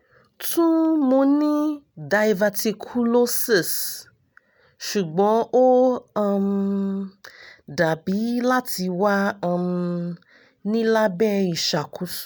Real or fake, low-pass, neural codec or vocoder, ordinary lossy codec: real; none; none; none